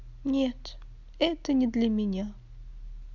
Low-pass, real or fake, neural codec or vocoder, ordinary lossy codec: 7.2 kHz; real; none; none